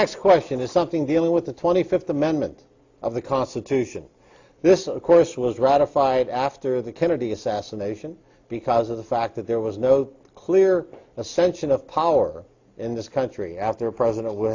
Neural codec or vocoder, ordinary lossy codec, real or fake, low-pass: none; AAC, 48 kbps; real; 7.2 kHz